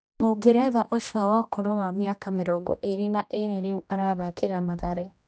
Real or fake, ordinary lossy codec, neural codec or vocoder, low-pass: fake; none; codec, 16 kHz, 1 kbps, X-Codec, HuBERT features, trained on general audio; none